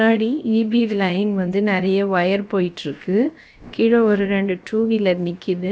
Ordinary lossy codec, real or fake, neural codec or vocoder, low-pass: none; fake; codec, 16 kHz, about 1 kbps, DyCAST, with the encoder's durations; none